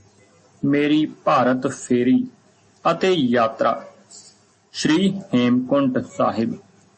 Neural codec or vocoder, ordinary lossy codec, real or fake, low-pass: none; MP3, 32 kbps; real; 10.8 kHz